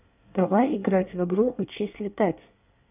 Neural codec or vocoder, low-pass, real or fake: codec, 24 kHz, 1 kbps, SNAC; 3.6 kHz; fake